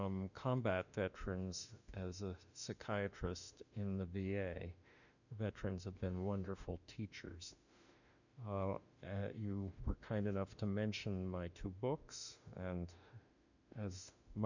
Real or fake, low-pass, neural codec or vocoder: fake; 7.2 kHz; autoencoder, 48 kHz, 32 numbers a frame, DAC-VAE, trained on Japanese speech